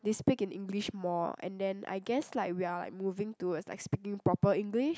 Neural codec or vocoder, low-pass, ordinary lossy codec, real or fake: none; none; none; real